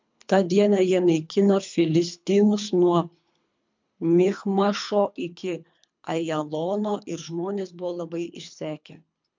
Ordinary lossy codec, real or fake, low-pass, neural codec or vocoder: MP3, 64 kbps; fake; 7.2 kHz; codec, 24 kHz, 3 kbps, HILCodec